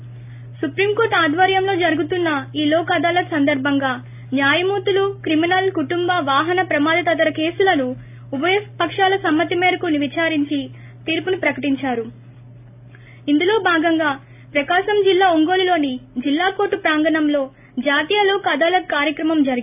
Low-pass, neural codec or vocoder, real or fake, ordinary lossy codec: 3.6 kHz; none; real; AAC, 32 kbps